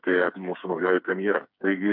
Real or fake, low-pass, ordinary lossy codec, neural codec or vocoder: fake; 5.4 kHz; MP3, 48 kbps; codec, 16 kHz, 4 kbps, FreqCodec, smaller model